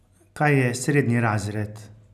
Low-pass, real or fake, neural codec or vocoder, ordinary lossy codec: 14.4 kHz; real; none; none